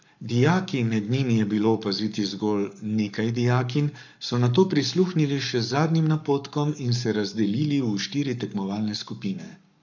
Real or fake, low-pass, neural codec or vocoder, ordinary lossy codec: fake; 7.2 kHz; codec, 44.1 kHz, 7.8 kbps, Pupu-Codec; none